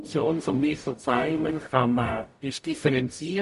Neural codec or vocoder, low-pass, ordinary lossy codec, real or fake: codec, 44.1 kHz, 0.9 kbps, DAC; 14.4 kHz; MP3, 48 kbps; fake